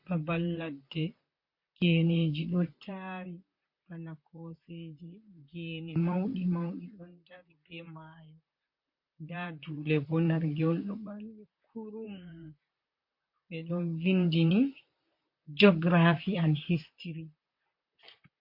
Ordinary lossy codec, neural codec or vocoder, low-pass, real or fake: MP3, 32 kbps; vocoder, 44.1 kHz, 128 mel bands, Pupu-Vocoder; 5.4 kHz; fake